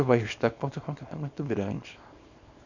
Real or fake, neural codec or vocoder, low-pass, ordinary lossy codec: fake; codec, 24 kHz, 0.9 kbps, WavTokenizer, small release; 7.2 kHz; none